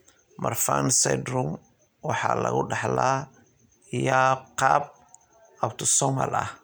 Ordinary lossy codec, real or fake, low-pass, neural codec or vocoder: none; real; none; none